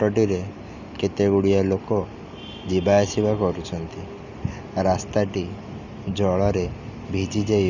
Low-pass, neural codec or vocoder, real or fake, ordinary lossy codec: 7.2 kHz; none; real; none